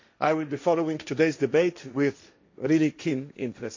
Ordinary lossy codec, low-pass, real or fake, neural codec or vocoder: MP3, 48 kbps; 7.2 kHz; fake; codec, 16 kHz, 1.1 kbps, Voila-Tokenizer